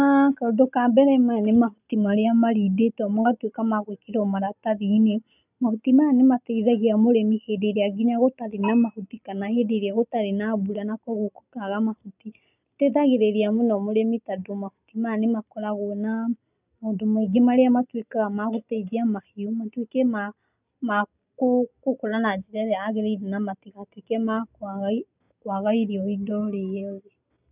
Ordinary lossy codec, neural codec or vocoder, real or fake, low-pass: none; none; real; 3.6 kHz